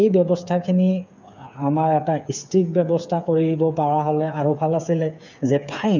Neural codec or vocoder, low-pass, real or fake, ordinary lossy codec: codec, 16 kHz, 8 kbps, FreqCodec, smaller model; 7.2 kHz; fake; none